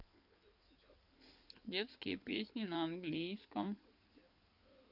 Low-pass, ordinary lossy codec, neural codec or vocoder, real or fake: 5.4 kHz; none; codec, 16 kHz in and 24 kHz out, 2.2 kbps, FireRedTTS-2 codec; fake